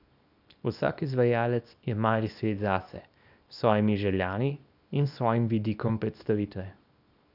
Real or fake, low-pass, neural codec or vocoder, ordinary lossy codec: fake; 5.4 kHz; codec, 24 kHz, 0.9 kbps, WavTokenizer, small release; none